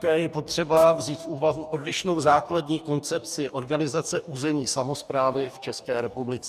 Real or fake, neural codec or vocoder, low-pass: fake; codec, 44.1 kHz, 2.6 kbps, DAC; 14.4 kHz